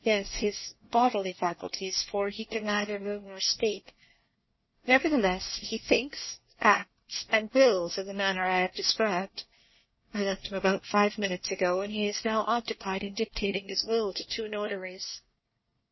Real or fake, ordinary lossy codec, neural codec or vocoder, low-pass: fake; MP3, 24 kbps; codec, 24 kHz, 1 kbps, SNAC; 7.2 kHz